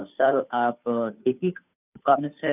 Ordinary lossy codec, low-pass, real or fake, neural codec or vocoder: none; 3.6 kHz; fake; codec, 16 kHz, 2 kbps, FunCodec, trained on Chinese and English, 25 frames a second